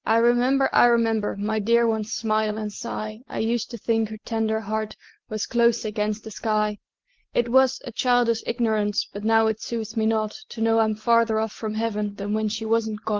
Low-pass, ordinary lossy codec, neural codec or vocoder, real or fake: 7.2 kHz; Opus, 16 kbps; none; real